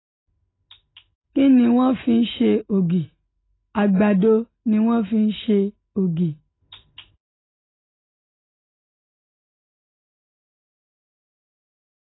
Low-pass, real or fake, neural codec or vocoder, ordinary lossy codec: 7.2 kHz; real; none; AAC, 16 kbps